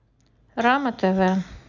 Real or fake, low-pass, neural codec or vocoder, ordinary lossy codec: real; 7.2 kHz; none; none